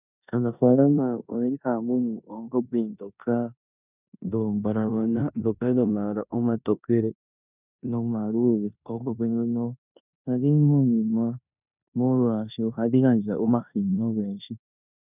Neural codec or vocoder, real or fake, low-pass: codec, 16 kHz in and 24 kHz out, 0.9 kbps, LongCat-Audio-Codec, four codebook decoder; fake; 3.6 kHz